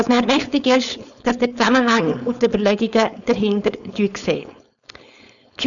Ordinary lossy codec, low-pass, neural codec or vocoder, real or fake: none; 7.2 kHz; codec, 16 kHz, 4.8 kbps, FACodec; fake